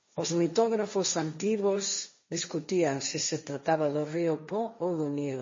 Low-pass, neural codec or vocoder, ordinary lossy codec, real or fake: 7.2 kHz; codec, 16 kHz, 1.1 kbps, Voila-Tokenizer; MP3, 32 kbps; fake